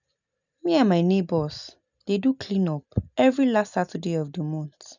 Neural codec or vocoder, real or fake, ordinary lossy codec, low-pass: none; real; none; 7.2 kHz